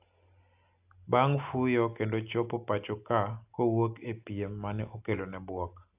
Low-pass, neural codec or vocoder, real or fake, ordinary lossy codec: 3.6 kHz; none; real; AAC, 32 kbps